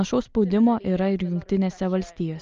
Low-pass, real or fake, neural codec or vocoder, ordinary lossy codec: 7.2 kHz; real; none; Opus, 24 kbps